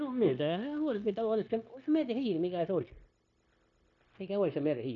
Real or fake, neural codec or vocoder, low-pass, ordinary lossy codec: fake; codec, 16 kHz, 0.9 kbps, LongCat-Audio-Codec; 7.2 kHz; AAC, 64 kbps